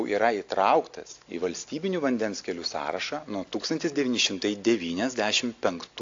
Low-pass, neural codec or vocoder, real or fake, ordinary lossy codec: 7.2 kHz; none; real; AAC, 48 kbps